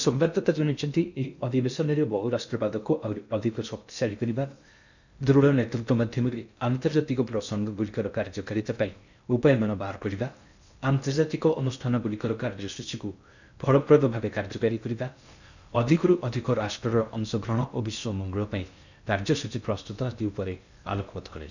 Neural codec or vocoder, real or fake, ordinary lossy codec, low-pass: codec, 16 kHz in and 24 kHz out, 0.6 kbps, FocalCodec, streaming, 2048 codes; fake; none; 7.2 kHz